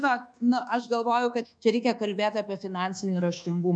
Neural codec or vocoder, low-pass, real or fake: codec, 24 kHz, 1.2 kbps, DualCodec; 9.9 kHz; fake